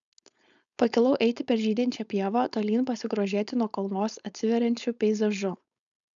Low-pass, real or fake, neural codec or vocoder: 7.2 kHz; fake; codec, 16 kHz, 4.8 kbps, FACodec